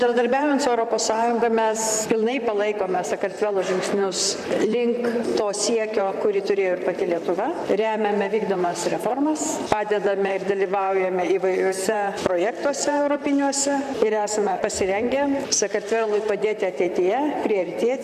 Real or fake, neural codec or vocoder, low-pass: fake; vocoder, 44.1 kHz, 128 mel bands, Pupu-Vocoder; 14.4 kHz